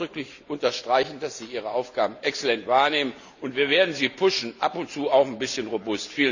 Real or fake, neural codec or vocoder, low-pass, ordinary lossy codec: real; none; 7.2 kHz; none